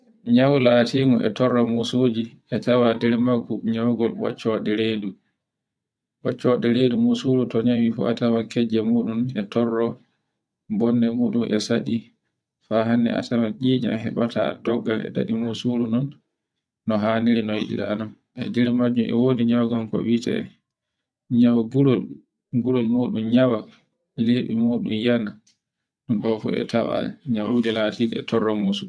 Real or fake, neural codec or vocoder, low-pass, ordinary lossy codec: fake; vocoder, 22.05 kHz, 80 mel bands, WaveNeXt; none; none